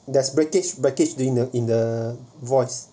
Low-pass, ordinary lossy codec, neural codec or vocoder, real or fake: none; none; none; real